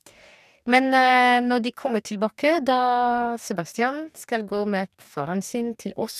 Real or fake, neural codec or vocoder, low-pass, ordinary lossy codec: fake; codec, 44.1 kHz, 2.6 kbps, DAC; 14.4 kHz; none